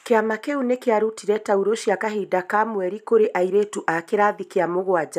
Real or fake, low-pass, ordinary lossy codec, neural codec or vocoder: real; 14.4 kHz; none; none